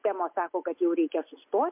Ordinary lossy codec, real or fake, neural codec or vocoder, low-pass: MP3, 32 kbps; real; none; 3.6 kHz